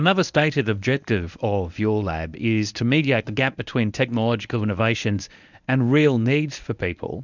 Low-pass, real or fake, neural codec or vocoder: 7.2 kHz; fake; codec, 24 kHz, 0.9 kbps, WavTokenizer, medium speech release version 1